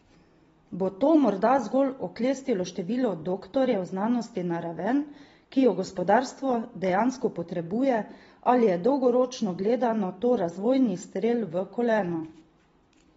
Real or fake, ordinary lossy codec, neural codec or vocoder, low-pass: real; AAC, 24 kbps; none; 19.8 kHz